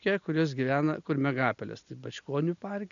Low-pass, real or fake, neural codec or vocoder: 7.2 kHz; real; none